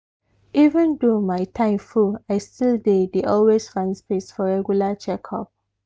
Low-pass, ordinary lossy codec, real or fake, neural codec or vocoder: none; none; real; none